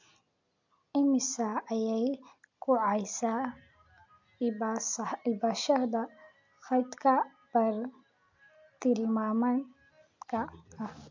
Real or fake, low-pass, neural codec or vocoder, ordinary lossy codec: real; 7.2 kHz; none; MP3, 48 kbps